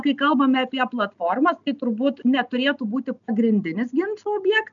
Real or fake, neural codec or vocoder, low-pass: real; none; 7.2 kHz